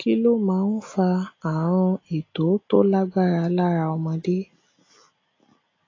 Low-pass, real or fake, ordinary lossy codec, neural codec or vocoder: 7.2 kHz; real; AAC, 32 kbps; none